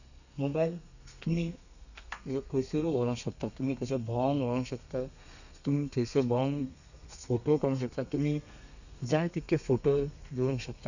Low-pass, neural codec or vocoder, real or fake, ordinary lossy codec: 7.2 kHz; codec, 32 kHz, 1.9 kbps, SNAC; fake; none